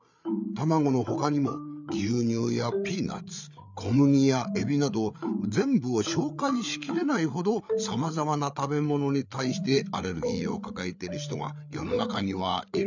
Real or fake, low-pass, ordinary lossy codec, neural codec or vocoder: fake; 7.2 kHz; none; codec, 16 kHz, 8 kbps, FreqCodec, larger model